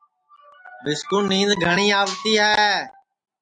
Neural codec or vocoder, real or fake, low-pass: none; real; 9.9 kHz